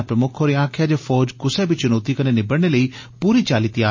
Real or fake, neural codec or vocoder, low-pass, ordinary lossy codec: real; none; 7.2 kHz; MP3, 32 kbps